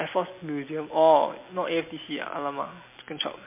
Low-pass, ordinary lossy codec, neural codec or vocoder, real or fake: 3.6 kHz; MP3, 24 kbps; none; real